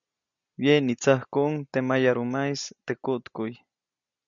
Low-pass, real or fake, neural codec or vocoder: 7.2 kHz; real; none